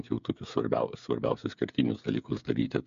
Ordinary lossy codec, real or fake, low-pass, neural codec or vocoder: MP3, 64 kbps; fake; 7.2 kHz; codec, 16 kHz, 8 kbps, FreqCodec, smaller model